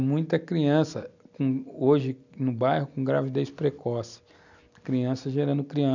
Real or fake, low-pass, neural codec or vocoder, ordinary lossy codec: real; 7.2 kHz; none; none